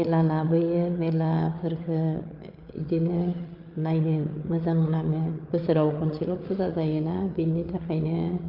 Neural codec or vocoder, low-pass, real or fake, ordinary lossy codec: codec, 16 kHz, 8 kbps, FreqCodec, larger model; 5.4 kHz; fake; Opus, 24 kbps